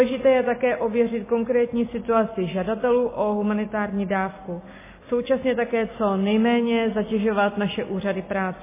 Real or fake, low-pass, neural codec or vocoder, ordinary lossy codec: real; 3.6 kHz; none; MP3, 16 kbps